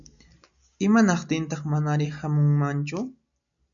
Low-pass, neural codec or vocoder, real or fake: 7.2 kHz; none; real